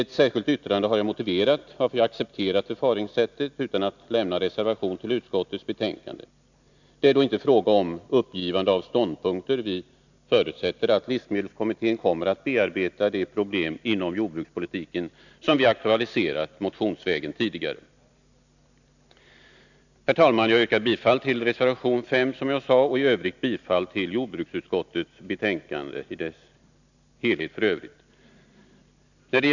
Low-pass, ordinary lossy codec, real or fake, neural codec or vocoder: 7.2 kHz; none; real; none